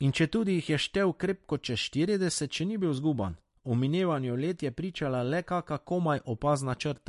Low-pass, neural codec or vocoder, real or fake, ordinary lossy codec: 14.4 kHz; none; real; MP3, 48 kbps